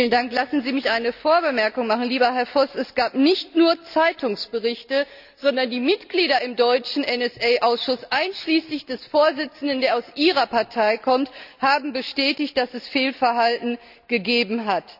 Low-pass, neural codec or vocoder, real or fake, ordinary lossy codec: 5.4 kHz; none; real; none